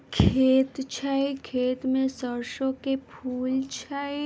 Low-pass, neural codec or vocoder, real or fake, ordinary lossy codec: none; none; real; none